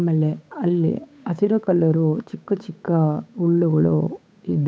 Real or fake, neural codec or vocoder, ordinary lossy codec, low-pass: fake; codec, 16 kHz, 8 kbps, FunCodec, trained on Chinese and English, 25 frames a second; none; none